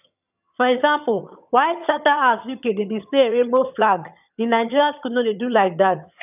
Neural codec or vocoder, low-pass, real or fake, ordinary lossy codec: vocoder, 22.05 kHz, 80 mel bands, HiFi-GAN; 3.6 kHz; fake; none